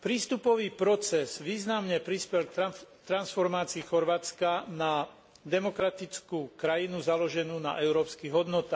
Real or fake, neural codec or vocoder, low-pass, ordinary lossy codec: real; none; none; none